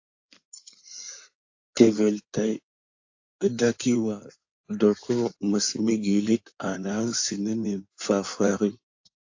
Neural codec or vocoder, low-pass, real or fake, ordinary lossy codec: codec, 16 kHz in and 24 kHz out, 2.2 kbps, FireRedTTS-2 codec; 7.2 kHz; fake; AAC, 48 kbps